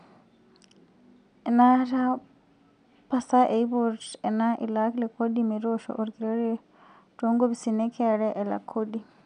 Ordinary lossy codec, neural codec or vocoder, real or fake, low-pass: none; none; real; 9.9 kHz